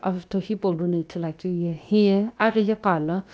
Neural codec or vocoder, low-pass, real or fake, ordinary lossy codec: codec, 16 kHz, about 1 kbps, DyCAST, with the encoder's durations; none; fake; none